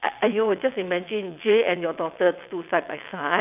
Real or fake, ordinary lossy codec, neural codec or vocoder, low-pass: fake; none; vocoder, 22.05 kHz, 80 mel bands, WaveNeXt; 3.6 kHz